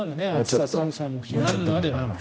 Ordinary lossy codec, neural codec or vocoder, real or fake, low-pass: none; codec, 16 kHz, 1 kbps, X-Codec, HuBERT features, trained on general audio; fake; none